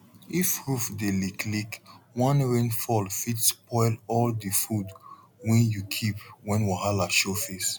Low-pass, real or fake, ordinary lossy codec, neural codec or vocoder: none; real; none; none